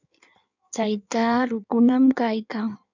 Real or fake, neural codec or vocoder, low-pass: fake; codec, 16 kHz in and 24 kHz out, 1.1 kbps, FireRedTTS-2 codec; 7.2 kHz